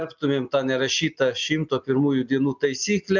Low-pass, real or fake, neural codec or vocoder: 7.2 kHz; real; none